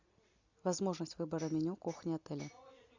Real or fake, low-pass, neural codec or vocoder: real; 7.2 kHz; none